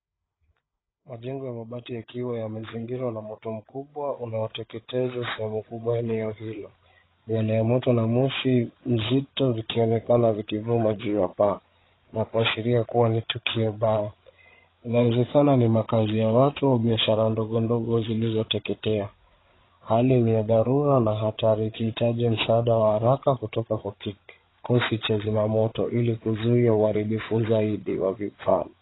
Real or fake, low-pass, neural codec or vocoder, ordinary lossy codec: fake; 7.2 kHz; codec, 16 kHz, 8 kbps, FreqCodec, larger model; AAC, 16 kbps